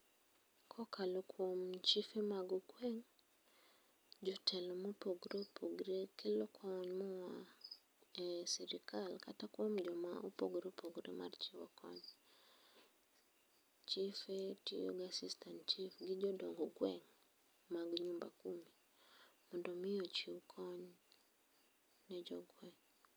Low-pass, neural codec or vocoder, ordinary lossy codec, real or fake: none; none; none; real